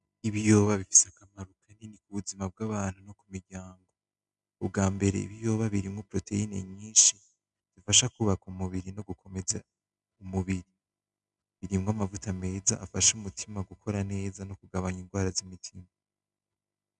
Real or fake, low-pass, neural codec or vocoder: real; 10.8 kHz; none